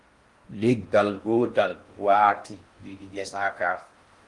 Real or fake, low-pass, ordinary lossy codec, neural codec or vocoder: fake; 10.8 kHz; Opus, 24 kbps; codec, 16 kHz in and 24 kHz out, 0.6 kbps, FocalCodec, streaming, 4096 codes